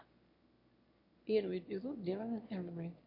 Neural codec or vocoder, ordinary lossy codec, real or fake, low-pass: autoencoder, 22.05 kHz, a latent of 192 numbers a frame, VITS, trained on one speaker; MP3, 32 kbps; fake; 5.4 kHz